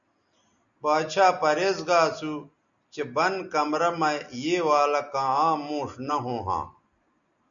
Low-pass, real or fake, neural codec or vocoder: 7.2 kHz; real; none